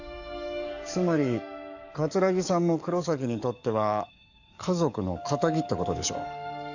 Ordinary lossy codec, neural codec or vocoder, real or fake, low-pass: none; codec, 44.1 kHz, 7.8 kbps, DAC; fake; 7.2 kHz